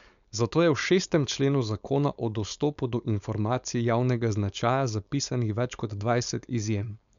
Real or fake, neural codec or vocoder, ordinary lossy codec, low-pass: fake; codec, 16 kHz, 4.8 kbps, FACodec; none; 7.2 kHz